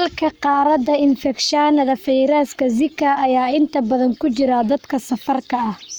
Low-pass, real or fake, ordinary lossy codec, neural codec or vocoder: none; fake; none; codec, 44.1 kHz, 7.8 kbps, Pupu-Codec